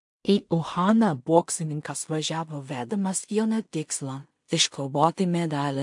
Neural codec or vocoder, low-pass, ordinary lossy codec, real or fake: codec, 16 kHz in and 24 kHz out, 0.4 kbps, LongCat-Audio-Codec, two codebook decoder; 10.8 kHz; MP3, 64 kbps; fake